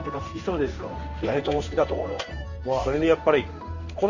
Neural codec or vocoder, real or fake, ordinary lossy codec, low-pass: codec, 16 kHz in and 24 kHz out, 1 kbps, XY-Tokenizer; fake; none; 7.2 kHz